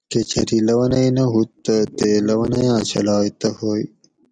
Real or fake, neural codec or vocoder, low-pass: real; none; 9.9 kHz